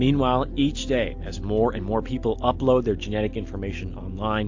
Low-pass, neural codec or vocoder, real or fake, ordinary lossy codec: 7.2 kHz; none; real; AAC, 48 kbps